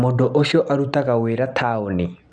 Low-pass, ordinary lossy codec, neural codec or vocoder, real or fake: 9.9 kHz; none; none; real